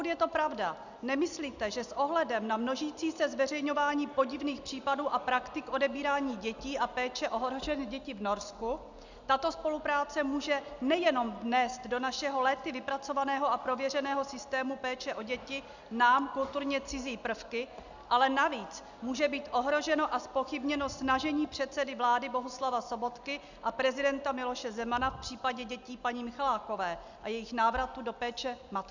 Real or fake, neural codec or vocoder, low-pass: real; none; 7.2 kHz